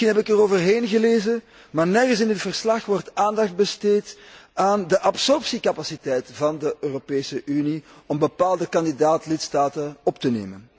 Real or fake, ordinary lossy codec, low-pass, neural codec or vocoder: real; none; none; none